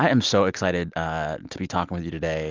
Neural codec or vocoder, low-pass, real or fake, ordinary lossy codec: none; 7.2 kHz; real; Opus, 24 kbps